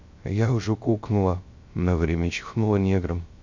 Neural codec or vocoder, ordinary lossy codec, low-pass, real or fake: codec, 16 kHz, 0.3 kbps, FocalCodec; MP3, 48 kbps; 7.2 kHz; fake